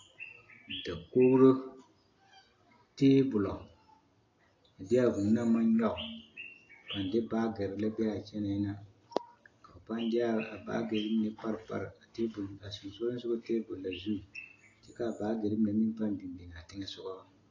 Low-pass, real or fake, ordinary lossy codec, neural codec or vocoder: 7.2 kHz; real; AAC, 32 kbps; none